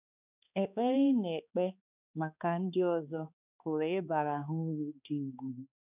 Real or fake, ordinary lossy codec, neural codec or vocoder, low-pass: fake; none; codec, 16 kHz, 2 kbps, X-Codec, HuBERT features, trained on balanced general audio; 3.6 kHz